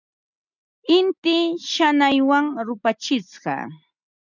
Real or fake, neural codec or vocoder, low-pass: real; none; 7.2 kHz